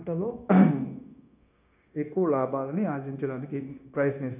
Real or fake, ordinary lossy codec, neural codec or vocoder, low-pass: fake; none; codec, 16 kHz, 0.9 kbps, LongCat-Audio-Codec; 3.6 kHz